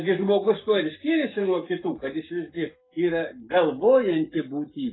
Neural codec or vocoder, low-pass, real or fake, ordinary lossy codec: codec, 44.1 kHz, 7.8 kbps, Pupu-Codec; 7.2 kHz; fake; AAC, 16 kbps